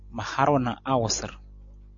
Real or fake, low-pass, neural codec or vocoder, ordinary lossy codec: real; 7.2 kHz; none; MP3, 48 kbps